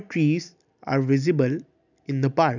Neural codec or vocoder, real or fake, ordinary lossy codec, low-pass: none; real; none; 7.2 kHz